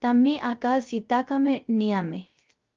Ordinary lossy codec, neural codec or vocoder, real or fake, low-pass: Opus, 32 kbps; codec, 16 kHz, 0.3 kbps, FocalCodec; fake; 7.2 kHz